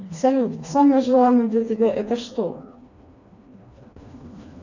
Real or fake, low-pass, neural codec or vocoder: fake; 7.2 kHz; codec, 16 kHz, 2 kbps, FreqCodec, smaller model